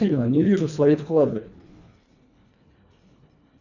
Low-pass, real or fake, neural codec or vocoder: 7.2 kHz; fake; codec, 24 kHz, 1.5 kbps, HILCodec